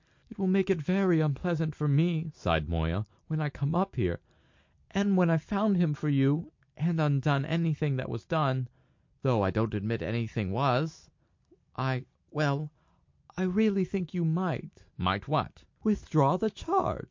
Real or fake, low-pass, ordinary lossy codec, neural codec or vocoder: real; 7.2 kHz; MP3, 48 kbps; none